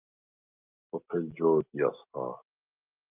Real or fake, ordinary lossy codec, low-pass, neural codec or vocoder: real; Opus, 32 kbps; 3.6 kHz; none